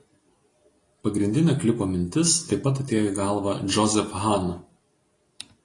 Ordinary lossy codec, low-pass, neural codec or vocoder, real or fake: AAC, 32 kbps; 10.8 kHz; none; real